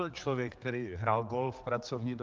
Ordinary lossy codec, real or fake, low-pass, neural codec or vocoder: Opus, 32 kbps; fake; 7.2 kHz; codec, 16 kHz, 4 kbps, X-Codec, HuBERT features, trained on general audio